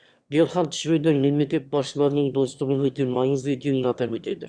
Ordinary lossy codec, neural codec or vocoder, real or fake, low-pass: Opus, 64 kbps; autoencoder, 22.05 kHz, a latent of 192 numbers a frame, VITS, trained on one speaker; fake; 9.9 kHz